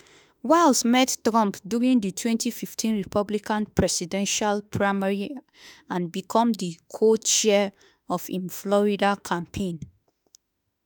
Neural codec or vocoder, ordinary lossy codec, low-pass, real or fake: autoencoder, 48 kHz, 32 numbers a frame, DAC-VAE, trained on Japanese speech; none; none; fake